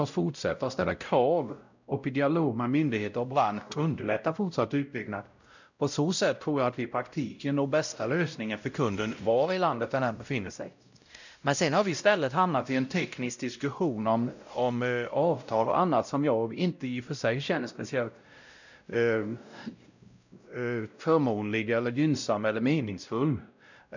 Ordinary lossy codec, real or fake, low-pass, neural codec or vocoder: none; fake; 7.2 kHz; codec, 16 kHz, 0.5 kbps, X-Codec, WavLM features, trained on Multilingual LibriSpeech